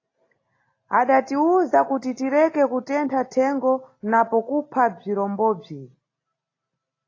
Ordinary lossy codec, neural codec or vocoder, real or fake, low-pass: AAC, 32 kbps; none; real; 7.2 kHz